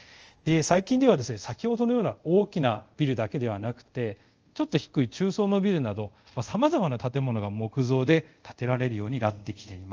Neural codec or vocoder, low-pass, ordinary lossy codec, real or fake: codec, 24 kHz, 0.5 kbps, DualCodec; 7.2 kHz; Opus, 24 kbps; fake